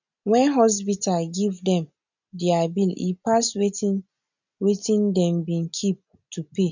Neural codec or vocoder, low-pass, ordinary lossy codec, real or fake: none; 7.2 kHz; none; real